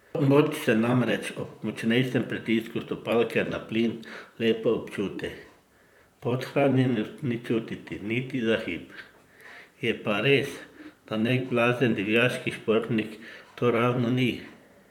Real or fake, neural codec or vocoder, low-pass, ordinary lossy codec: fake; vocoder, 44.1 kHz, 128 mel bands, Pupu-Vocoder; 19.8 kHz; none